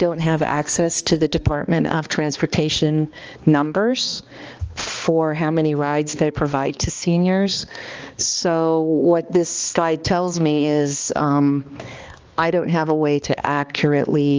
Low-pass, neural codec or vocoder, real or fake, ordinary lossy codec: 7.2 kHz; codec, 16 kHz, 2 kbps, X-Codec, HuBERT features, trained on balanced general audio; fake; Opus, 24 kbps